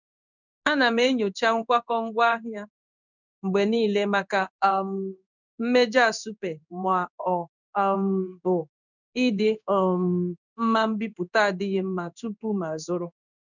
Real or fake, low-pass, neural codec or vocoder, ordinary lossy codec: fake; 7.2 kHz; codec, 16 kHz in and 24 kHz out, 1 kbps, XY-Tokenizer; none